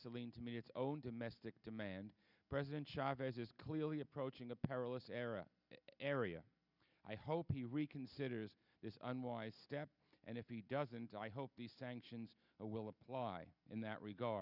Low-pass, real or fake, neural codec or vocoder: 5.4 kHz; real; none